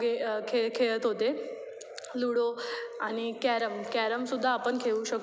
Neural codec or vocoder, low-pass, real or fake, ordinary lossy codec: none; none; real; none